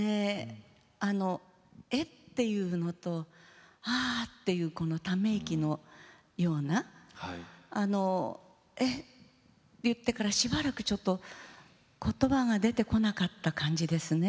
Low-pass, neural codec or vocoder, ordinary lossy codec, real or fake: none; none; none; real